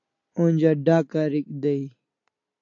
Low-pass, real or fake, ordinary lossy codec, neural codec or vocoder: 7.2 kHz; real; AAC, 48 kbps; none